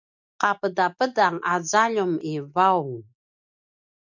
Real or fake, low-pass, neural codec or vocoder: real; 7.2 kHz; none